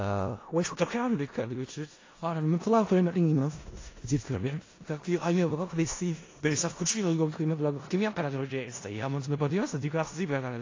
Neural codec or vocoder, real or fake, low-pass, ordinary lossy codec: codec, 16 kHz in and 24 kHz out, 0.4 kbps, LongCat-Audio-Codec, four codebook decoder; fake; 7.2 kHz; AAC, 32 kbps